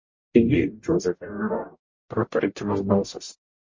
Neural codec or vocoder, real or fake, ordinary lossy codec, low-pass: codec, 44.1 kHz, 0.9 kbps, DAC; fake; MP3, 32 kbps; 7.2 kHz